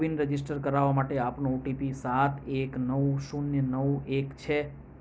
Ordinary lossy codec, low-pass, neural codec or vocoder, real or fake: none; none; none; real